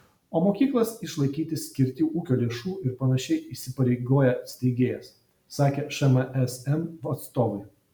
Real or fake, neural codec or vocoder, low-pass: real; none; 19.8 kHz